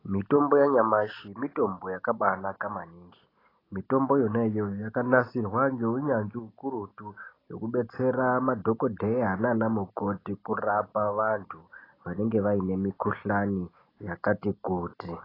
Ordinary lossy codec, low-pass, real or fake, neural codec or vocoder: AAC, 24 kbps; 5.4 kHz; real; none